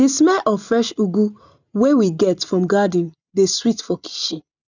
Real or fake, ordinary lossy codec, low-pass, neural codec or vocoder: real; none; 7.2 kHz; none